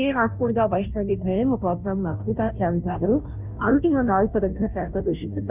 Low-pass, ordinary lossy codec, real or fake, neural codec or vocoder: 3.6 kHz; none; fake; codec, 16 kHz, 0.5 kbps, FunCodec, trained on Chinese and English, 25 frames a second